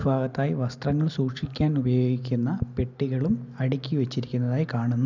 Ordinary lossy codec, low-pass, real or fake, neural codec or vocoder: none; 7.2 kHz; real; none